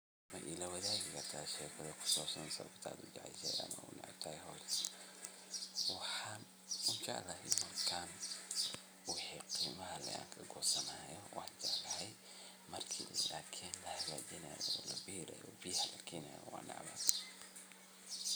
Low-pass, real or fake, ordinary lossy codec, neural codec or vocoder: none; real; none; none